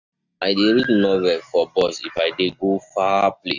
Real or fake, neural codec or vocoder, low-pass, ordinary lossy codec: real; none; 7.2 kHz; none